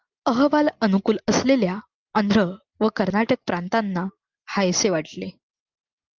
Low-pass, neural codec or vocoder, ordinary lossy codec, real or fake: 7.2 kHz; none; Opus, 24 kbps; real